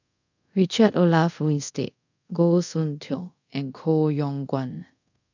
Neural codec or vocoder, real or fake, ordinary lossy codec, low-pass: codec, 24 kHz, 0.5 kbps, DualCodec; fake; none; 7.2 kHz